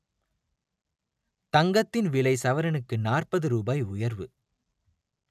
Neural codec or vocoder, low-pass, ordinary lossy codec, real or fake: none; 14.4 kHz; none; real